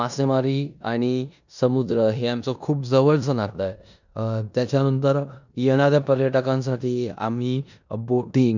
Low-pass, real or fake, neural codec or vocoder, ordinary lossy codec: 7.2 kHz; fake; codec, 16 kHz in and 24 kHz out, 0.9 kbps, LongCat-Audio-Codec, four codebook decoder; none